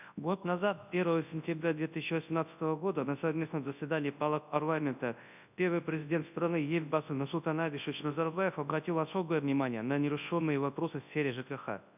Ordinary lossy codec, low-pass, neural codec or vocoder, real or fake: none; 3.6 kHz; codec, 24 kHz, 0.9 kbps, WavTokenizer, large speech release; fake